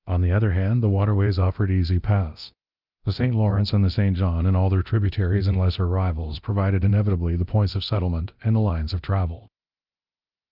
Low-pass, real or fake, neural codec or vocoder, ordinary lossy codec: 5.4 kHz; fake; codec, 24 kHz, 0.9 kbps, DualCodec; Opus, 24 kbps